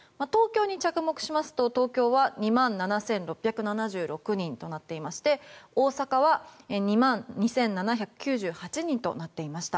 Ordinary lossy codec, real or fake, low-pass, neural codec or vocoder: none; real; none; none